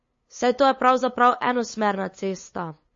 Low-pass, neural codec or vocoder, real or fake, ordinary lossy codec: 7.2 kHz; codec, 16 kHz, 8 kbps, FunCodec, trained on LibriTTS, 25 frames a second; fake; MP3, 32 kbps